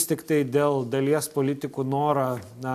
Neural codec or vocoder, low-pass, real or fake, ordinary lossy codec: none; 14.4 kHz; real; AAC, 96 kbps